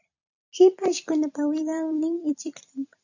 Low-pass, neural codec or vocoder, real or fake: 7.2 kHz; vocoder, 44.1 kHz, 128 mel bands every 256 samples, BigVGAN v2; fake